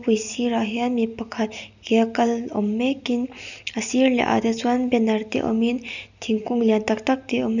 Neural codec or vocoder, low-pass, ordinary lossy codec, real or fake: none; 7.2 kHz; none; real